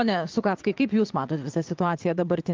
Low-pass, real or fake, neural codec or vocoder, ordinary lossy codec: 7.2 kHz; fake; vocoder, 22.05 kHz, 80 mel bands, WaveNeXt; Opus, 32 kbps